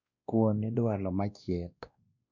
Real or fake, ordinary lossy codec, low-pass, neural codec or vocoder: fake; none; 7.2 kHz; codec, 16 kHz, 1 kbps, X-Codec, WavLM features, trained on Multilingual LibriSpeech